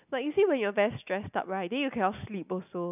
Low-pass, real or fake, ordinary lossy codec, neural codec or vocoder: 3.6 kHz; real; none; none